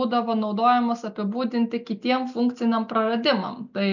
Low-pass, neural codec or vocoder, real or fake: 7.2 kHz; none; real